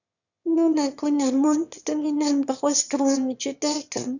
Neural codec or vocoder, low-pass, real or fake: autoencoder, 22.05 kHz, a latent of 192 numbers a frame, VITS, trained on one speaker; 7.2 kHz; fake